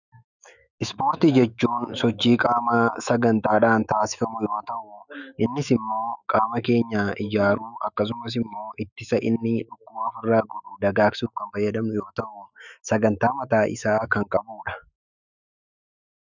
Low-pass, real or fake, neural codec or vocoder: 7.2 kHz; fake; autoencoder, 48 kHz, 128 numbers a frame, DAC-VAE, trained on Japanese speech